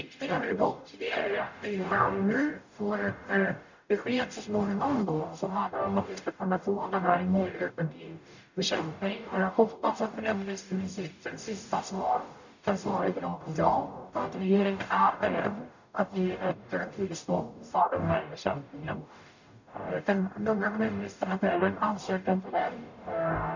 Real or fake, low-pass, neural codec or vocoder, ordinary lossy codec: fake; 7.2 kHz; codec, 44.1 kHz, 0.9 kbps, DAC; none